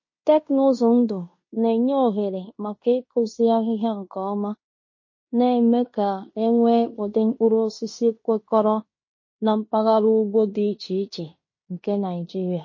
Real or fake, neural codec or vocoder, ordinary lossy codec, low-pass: fake; codec, 16 kHz in and 24 kHz out, 0.9 kbps, LongCat-Audio-Codec, fine tuned four codebook decoder; MP3, 32 kbps; 7.2 kHz